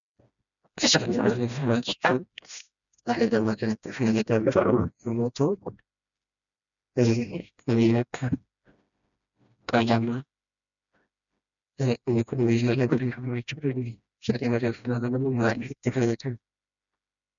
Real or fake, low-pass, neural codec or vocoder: fake; 7.2 kHz; codec, 16 kHz, 1 kbps, FreqCodec, smaller model